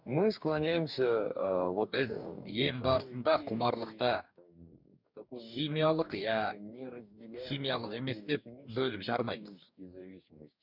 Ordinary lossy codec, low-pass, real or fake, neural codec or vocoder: none; 5.4 kHz; fake; codec, 44.1 kHz, 2.6 kbps, DAC